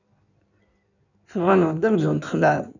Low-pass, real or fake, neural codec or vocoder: 7.2 kHz; fake; codec, 16 kHz in and 24 kHz out, 1.1 kbps, FireRedTTS-2 codec